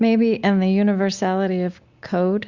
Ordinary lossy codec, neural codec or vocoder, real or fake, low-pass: Opus, 64 kbps; none; real; 7.2 kHz